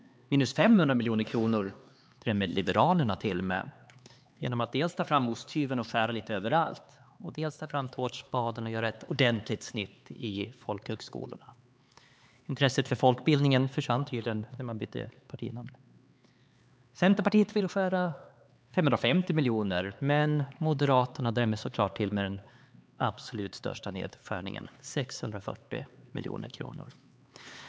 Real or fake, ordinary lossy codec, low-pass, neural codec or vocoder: fake; none; none; codec, 16 kHz, 4 kbps, X-Codec, HuBERT features, trained on LibriSpeech